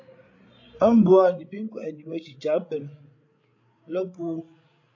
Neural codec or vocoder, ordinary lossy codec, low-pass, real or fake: codec, 16 kHz, 8 kbps, FreqCodec, larger model; AAC, 48 kbps; 7.2 kHz; fake